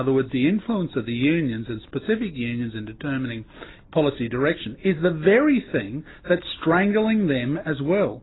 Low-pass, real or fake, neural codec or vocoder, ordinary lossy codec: 7.2 kHz; real; none; AAC, 16 kbps